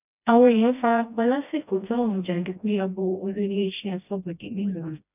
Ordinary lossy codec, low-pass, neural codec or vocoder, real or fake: none; 3.6 kHz; codec, 16 kHz, 1 kbps, FreqCodec, smaller model; fake